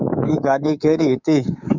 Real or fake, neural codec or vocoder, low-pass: fake; codec, 24 kHz, 3.1 kbps, DualCodec; 7.2 kHz